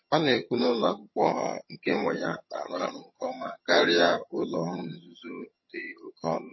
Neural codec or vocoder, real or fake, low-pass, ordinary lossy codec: vocoder, 22.05 kHz, 80 mel bands, HiFi-GAN; fake; 7.2 kHz; MP3, 24 kbps